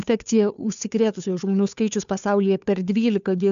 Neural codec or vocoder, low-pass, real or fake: codec, 16 kHz, 2 kbps, FunCodec, trained on Chinese and English, 25 frames a second; 7.2 kHz; fake